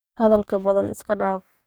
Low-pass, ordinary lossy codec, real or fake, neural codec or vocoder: none; none; fake; codec, 44.1 kHz, 2.6 kbps, DAC